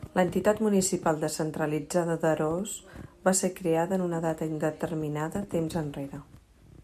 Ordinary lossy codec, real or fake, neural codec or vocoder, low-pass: AAC, 96 kbps; real; none; 14.4 kHz